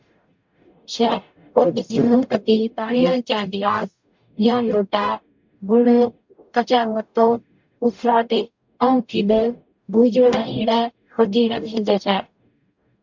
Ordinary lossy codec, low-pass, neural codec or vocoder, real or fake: MP3, 64 kbps; 7.2 kHz; codec, 44.1 kHz, 0.9 kbps, DAC; fake